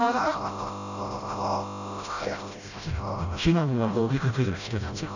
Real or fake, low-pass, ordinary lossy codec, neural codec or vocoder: fake; 7.2 kHz; none; codec, 16 kHz, 0.5 kbps, FreqCodec, smaller model